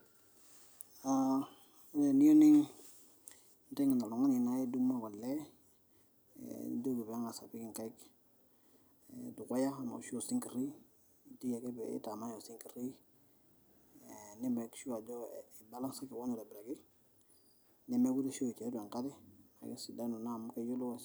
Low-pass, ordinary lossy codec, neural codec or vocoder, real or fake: none; none; none; real